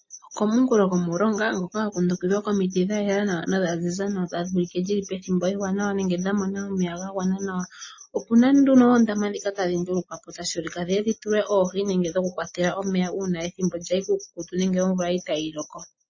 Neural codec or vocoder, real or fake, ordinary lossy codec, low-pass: none; real; MP3, 32 kbps; 7.2 kHz